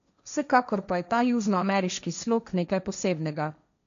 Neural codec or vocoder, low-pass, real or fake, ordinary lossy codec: codec, 16 kHz, 1.1 kbps, Voila-Tokenizer; 7.2 kHz; fake; MP3, 64 kbps